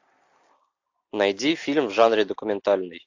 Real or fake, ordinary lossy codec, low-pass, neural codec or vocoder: real; AAC, 48 kbps; 7.2 kHz; none